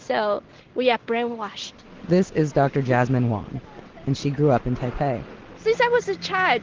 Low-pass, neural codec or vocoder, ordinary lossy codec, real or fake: 7.2 kHz; none; Opus, 16 kbps; real